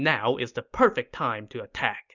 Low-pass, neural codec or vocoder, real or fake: 7.2 kHz; none; real